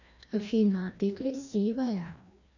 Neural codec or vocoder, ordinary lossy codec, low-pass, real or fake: codec, 16 kHz, 1 kbps, FreqCodec, larger model; none; 7.2 kHz; fake